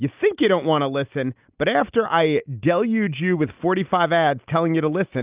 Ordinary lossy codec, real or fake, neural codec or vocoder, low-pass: Opus, 24 kbps; real; none; 3.6 kHz